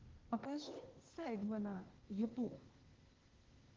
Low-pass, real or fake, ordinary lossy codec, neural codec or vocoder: 7.2 kHz; fake; Opus, 16 kbps; codec, 16 kHz, 0.8 kbps, ZipCodec